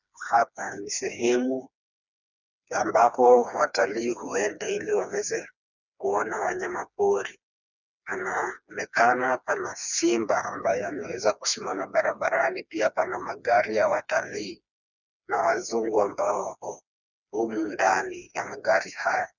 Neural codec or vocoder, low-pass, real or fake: codec, 16 kHz, 2 kbps, FreqCodec, smaller model; 7.2 kHz; fake